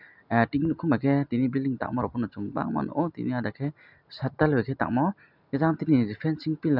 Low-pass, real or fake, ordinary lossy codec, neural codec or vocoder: 5.4 kHz; real; none; none